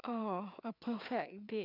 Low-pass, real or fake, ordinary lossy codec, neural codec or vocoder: 5.4 kHz; fake; none; codec, 16 kHz, 4 kbps, FreqCodec, larger model